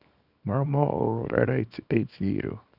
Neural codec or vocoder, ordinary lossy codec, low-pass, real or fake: codec, 24 kHz, 0.9 kbps, WavTokenizer, small release; none; 5.4 kHz; fake